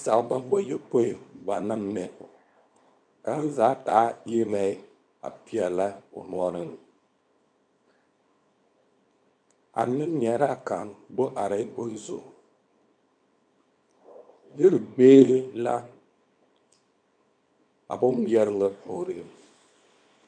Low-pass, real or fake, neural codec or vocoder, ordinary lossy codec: 9.9 kHz; fake; codec, 24 kHz, 0.9 kbps, WavTokenizer, small release; MP3, 64 kbps